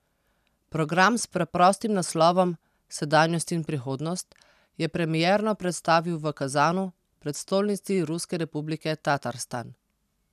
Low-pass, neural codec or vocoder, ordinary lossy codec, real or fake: 14.4 kHz; none; none; real